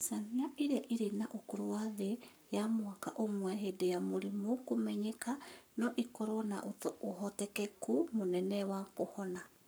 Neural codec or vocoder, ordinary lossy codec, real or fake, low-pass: codec, 44.1 kHz, 7.8 kbps, Pupu-Codec; none; fake; none